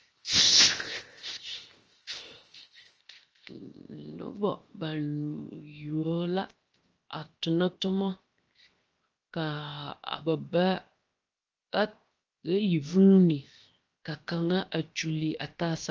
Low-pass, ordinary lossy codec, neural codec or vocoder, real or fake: 7.2 kHz; Opus, 24 kbps; codec, 16 kHz, 0.7 kbps, FocalCodec; fake